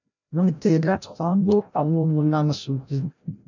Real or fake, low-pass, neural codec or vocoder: fake; 7.2 kHz; codec, 16 kHz, 0.5 kbps, FreqCodec, larger model